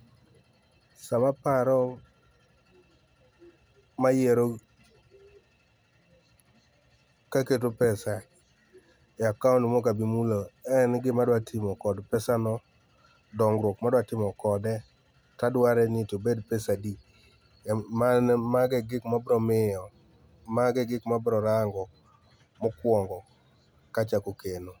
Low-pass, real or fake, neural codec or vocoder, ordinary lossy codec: none; real; none; none